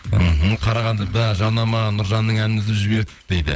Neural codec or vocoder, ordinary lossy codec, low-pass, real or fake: codec, 16 kHz, 16 kbps, FunCodec, trained on LibriTTS, 50 frames a second; none; none; fake